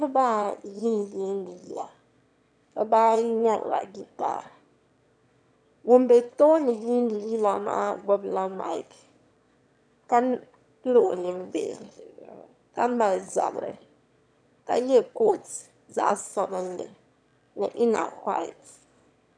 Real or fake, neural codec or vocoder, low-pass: fake; autoencoder, 22.05 kHz, a latent of 192 numbers a frame, VITS, trained on one speaker; 9.9 kHz